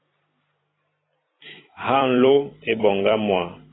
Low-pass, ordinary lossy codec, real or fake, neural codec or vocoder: 7.2 kHz; AAC, 16 kbps; fake; vocoder, 44.1 kHz, 128 mel bands every 256 samples, BigVGAN v2